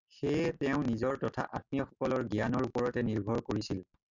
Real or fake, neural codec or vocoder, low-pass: real; none; 7.2 kHz